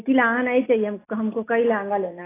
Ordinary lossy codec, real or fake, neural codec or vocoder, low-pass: AAC, 16 kbps; real; none; 3.6 kHz